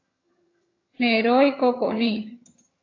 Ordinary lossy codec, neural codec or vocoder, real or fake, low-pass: AAC, 32 kbps; vocoder, 22.05 kHz, 80 mel bands, HiFi-GAN; fake; 7.2 kHz